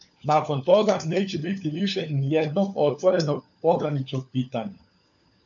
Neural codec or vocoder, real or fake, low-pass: codec, 16 kHz, 4 kbps, FunCodec, trained on LibriTTS, 50 frames a second; fake; 7.2 kHz